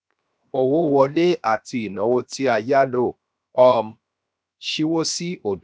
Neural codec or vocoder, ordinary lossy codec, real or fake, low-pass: codec, 16 kHz, 0.7 kbps, FocalCodec; none; fake; none